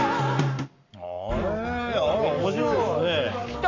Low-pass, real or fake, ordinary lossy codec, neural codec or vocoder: 7.2 kHz; fake; none; codec, 44.1 kHz, 7.8 kbps, Pupu-Codec